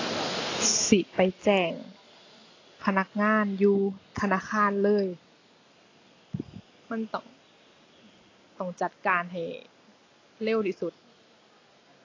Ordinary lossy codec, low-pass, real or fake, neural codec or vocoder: AAC, 32 kbps; 7.2 kHz; real; none